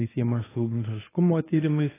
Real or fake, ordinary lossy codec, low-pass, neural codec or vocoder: fake; AAC, 16 kbps; 3.6 kHz; codec, 24 kHz, 0.9 kbps, WavTokenizer, medium speech release version 1